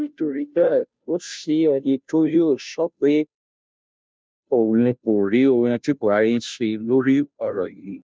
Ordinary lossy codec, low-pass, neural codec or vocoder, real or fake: none; none; codec, 16 kHz, 0.5 kbps, FunCodec, trained on Chinese and English, 25 frames a second; fake